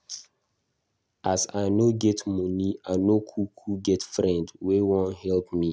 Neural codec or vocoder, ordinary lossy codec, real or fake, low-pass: none; none; real; none